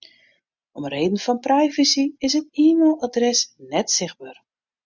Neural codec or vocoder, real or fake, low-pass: none; real; 7.2 kHz